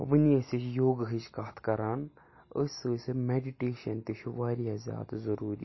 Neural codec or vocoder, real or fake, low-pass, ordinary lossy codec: none; real; 7.2 kHz; MP3, 24 kbps